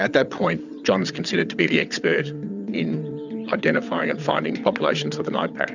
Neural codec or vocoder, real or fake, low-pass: vocoder, 22.05 kHz, 80 mel bands, WaveNeXt; fake; 7.2 kHz